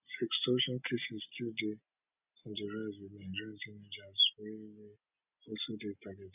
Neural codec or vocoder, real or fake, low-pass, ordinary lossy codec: none; real; 3.6 kHz; none